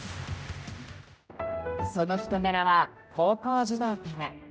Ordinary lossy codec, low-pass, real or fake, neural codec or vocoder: none; none; fake; codec, 16 kHz, 0.5 kbps, X-Codec, HuBERT features, trained on general audio